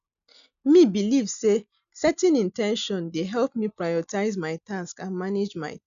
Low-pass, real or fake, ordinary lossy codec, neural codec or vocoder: 7.2 kHz; real; none; none